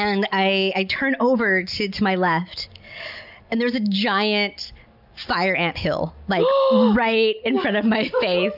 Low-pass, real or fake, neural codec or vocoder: 5.4 kHz; real; none